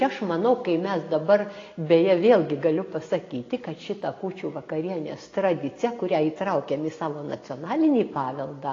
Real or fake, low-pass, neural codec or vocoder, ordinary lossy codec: real; 7.2 kHz; none; AAC, 32 kbps